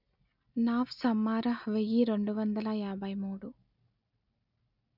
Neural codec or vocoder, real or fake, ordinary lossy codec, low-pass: none; real; none; 5.4 kHz